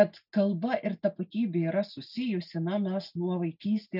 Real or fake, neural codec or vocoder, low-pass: real; none; 5.4 kHz